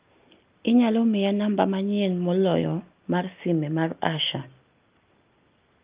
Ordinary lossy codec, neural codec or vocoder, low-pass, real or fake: Opus, 24 kbps; none; 3.6 kHz; real